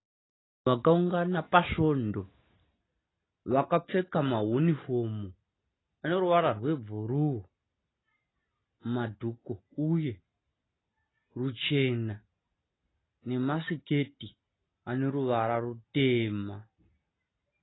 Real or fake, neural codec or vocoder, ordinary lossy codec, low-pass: real; none; AAC, 16 kbps; 7.2 kHz